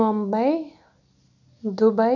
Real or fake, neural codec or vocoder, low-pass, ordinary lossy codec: real; none; 7.2 kHz; none